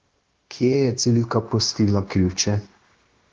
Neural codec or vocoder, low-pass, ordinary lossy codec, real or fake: codec, 16 kHz, 0.9 kbps, LongCat-Audio-Codec; 7.2 kHz; Opus, 16 kbps; fake